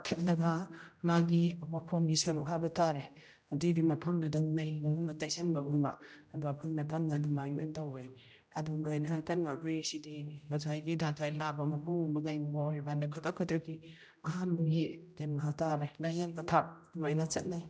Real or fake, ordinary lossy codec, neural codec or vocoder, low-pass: fake; none; codec, 16 kHz, 0.5 kbps, X-Codec, HuBERT features, trained on general audio; none